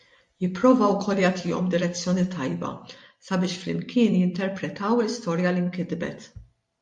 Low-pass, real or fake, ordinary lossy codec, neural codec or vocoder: 10.8 kHz; fake; MP3, 48 kbps; vocoder, 44.1 kHz, 128 mel bands every 256 samples, BigVGAN v2